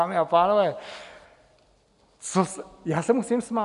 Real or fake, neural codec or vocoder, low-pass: real; none; 10.8 kHz